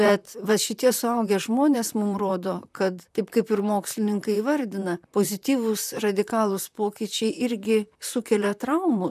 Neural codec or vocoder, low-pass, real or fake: vocoder, 44.1 kHz, 128 mel bands, Pupu-Vocoder; 14.4 kHz; fake